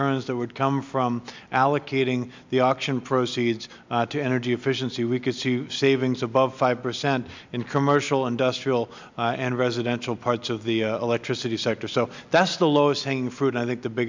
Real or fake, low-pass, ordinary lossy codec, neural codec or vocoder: real; 7.2 kHz; MP3, 64 kbps; none